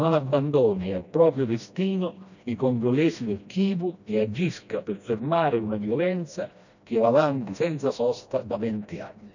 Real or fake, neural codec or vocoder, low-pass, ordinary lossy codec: fake; codec, 16 kHz, 1 kbps, FreqCodec, smaller model; 7.2 kHz; none